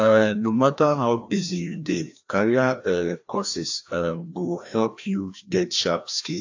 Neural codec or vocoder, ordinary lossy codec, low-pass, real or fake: codec, 16 kHz, 1 kbps, FreqCodec, larger model; AAC, 48 kbps; 7.2 kHz; fake